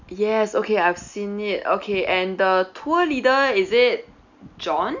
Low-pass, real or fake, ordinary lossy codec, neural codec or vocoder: 7.2 kHz; real; none; none